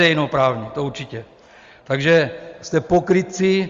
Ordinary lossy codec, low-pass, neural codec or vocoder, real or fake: Opus, 32 kbps; 7.2 kHz; none; real